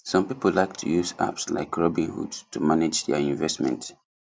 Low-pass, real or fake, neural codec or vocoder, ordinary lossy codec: none; real; none; none